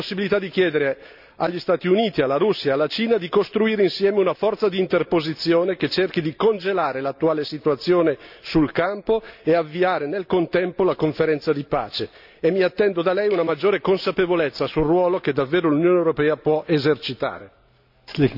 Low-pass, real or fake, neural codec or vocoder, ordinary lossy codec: 5.4 kHz; real; none; none